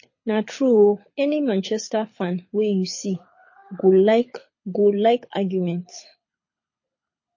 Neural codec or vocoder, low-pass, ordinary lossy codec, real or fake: codec, 24 kHz, 6 kbps, HILCodec; 7.2 kHz; MP3, 32 kbps; fake